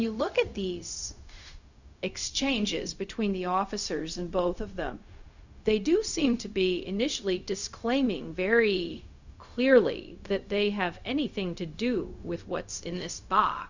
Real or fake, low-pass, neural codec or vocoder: fake; 7.2 kHz; codec, 16 kHz, 0.4 kbps, LongCat-Audio-Codec